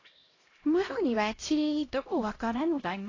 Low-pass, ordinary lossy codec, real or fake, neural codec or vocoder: 7.2 kHz; AAC, 32 kbps; fake; codec, 16 kHz, 0.5 kbps, X-Codec, HuBERT features, trained on LibriSpeech